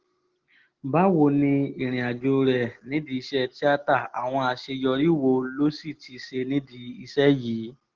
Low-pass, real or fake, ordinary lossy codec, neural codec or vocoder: 7.2 kHz; real; Opus, 16 kbps; none